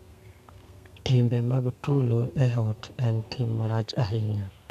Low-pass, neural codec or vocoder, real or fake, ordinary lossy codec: 14.4 kHz; codec, 32 kHz, 1.9 kbps, SNAC; fake; none